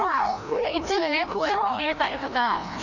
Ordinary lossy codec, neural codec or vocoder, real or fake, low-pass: none; codec, 16 kHz, 1 kbps, FreqCodec, larger model; fake; 7.2 kHz